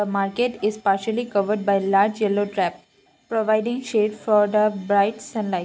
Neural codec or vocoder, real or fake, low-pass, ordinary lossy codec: none; real; none; none